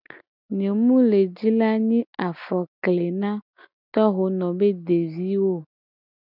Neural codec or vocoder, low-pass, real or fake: none; 5.4 kHz; real